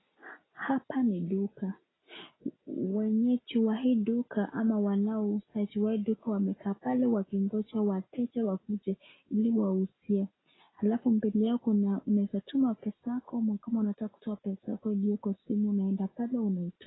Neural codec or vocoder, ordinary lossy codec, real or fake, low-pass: none; AAC, 16 kbps; real; 7.2 kHz